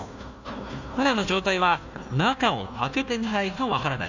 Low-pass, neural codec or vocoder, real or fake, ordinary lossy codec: 7.2 kHz; codec, 16 kHz, 1 kbps, FunCodec, trained on Chinese and English, 50 frames a second; fake; none